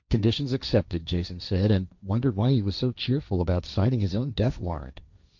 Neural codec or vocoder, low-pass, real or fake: codec, 16 kHz, 1.1 kbps, Voila-Tokenizer; 7.2 kHz; fake